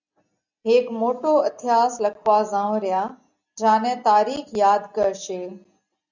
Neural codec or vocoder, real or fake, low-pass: none; real; 7.2 kHz